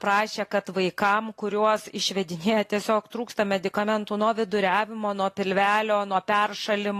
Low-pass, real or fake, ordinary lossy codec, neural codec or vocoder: 14.4 kHz; real; AAC, 48 kbps; none